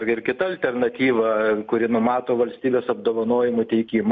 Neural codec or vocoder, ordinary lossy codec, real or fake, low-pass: none; MP3, 64 kbps; real; 7.2 kHz